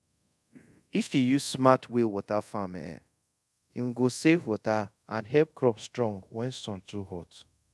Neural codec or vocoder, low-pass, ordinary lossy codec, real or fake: codec, 24 kHz, 0.5 kbps, DualCodec; none; none; fake